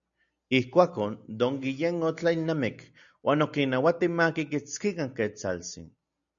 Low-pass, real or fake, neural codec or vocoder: 7.2 kHz; real; none